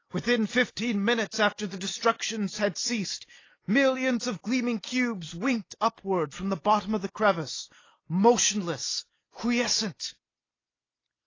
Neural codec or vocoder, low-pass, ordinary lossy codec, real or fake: none; 7.2 kHz; AAC, 32 kbps; real